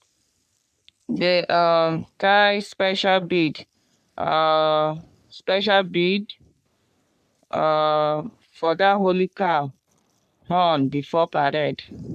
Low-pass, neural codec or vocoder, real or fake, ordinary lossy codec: 14.4 kHz; codec, 44.1 kHz, 3.4 kbps, Pupu-Codec; fake; none